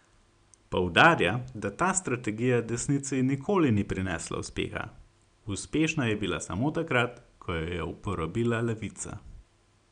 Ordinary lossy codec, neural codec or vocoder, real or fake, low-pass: none; none; real; 9.9 kHz